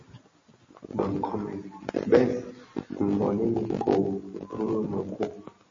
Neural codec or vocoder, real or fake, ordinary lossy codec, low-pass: none; real; MP3, 32 kbps; 7.2 kHz